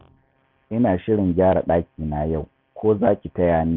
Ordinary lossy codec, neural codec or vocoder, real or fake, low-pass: none; none; real; 5.4 kHz